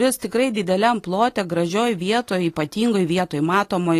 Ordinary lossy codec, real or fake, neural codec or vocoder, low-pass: AAC, 48 kbps; real; none; 14.4 kHz